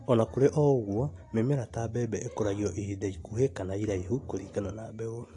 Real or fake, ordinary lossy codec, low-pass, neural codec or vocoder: real; AAC, 48 kbps; 10.8 kHz; none